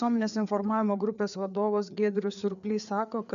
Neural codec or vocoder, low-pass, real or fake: codec, 16 kHz, 4 kbps, FreqCodec, larger model; 7.2 kHz; fake